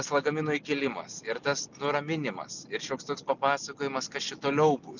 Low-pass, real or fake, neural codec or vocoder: 7.2 kHz; real; none